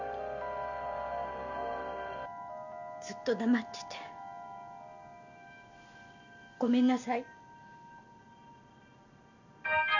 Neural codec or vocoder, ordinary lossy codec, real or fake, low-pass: none; none; real; 7.2 kHz